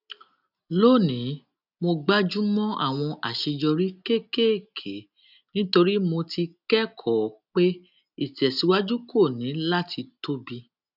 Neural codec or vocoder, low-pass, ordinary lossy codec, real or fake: none; 5.4 kHz; none; real